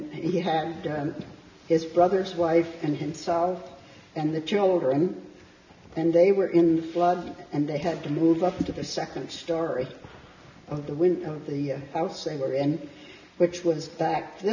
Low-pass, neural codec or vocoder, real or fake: 7.2 kHz; none; real